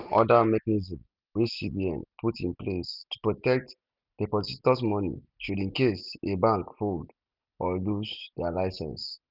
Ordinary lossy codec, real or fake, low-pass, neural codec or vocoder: none; real; 5.4 kHz; none